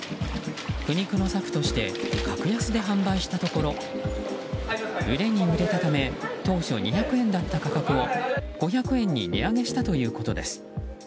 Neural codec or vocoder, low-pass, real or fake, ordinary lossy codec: none; none; real; none